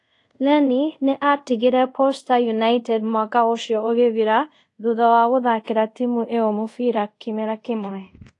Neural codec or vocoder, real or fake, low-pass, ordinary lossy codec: codec, 24 kHz, 0.5 kbps, DualCodec; fake; 10.8 kHz; AAC, 48 kbps